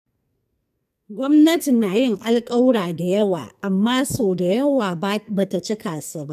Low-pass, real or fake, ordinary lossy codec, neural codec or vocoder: 14.4 kHz; fake; none; codec, 44.1 kHz, 2.6 kbps, SNAC